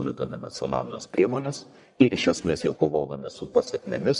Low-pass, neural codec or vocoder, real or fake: 10.8 kHz; codec, 44.1 kHz, 1.7 kbps, Pupu-Codec; fake